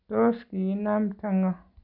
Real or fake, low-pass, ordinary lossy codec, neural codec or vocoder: real; 5.4 kHz; none; none